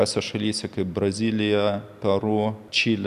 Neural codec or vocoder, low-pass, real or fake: none; 14.4 kHz; real